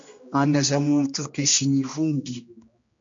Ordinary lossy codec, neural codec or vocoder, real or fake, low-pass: MP3, 48 kbps; codec, 16 kHz, 1 kbps, X-Codec, HuBERT features, trained on general audio; fake; 7.2 kHz